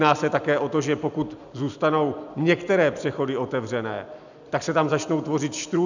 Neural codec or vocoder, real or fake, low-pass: none; real; 7.2 kHz